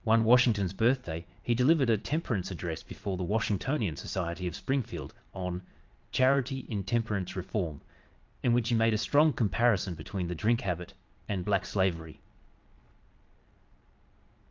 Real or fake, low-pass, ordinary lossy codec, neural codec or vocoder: fake; 7.2 kHz; Opus, 24 kbps; vocoder, 22.05 kHz, 80 mel bands, Vocos